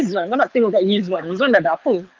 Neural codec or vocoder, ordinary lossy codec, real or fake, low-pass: codec, 24 kHz, 6 kbps, HILCodec; Opus, 32 kbps; fake; 7.2 kHz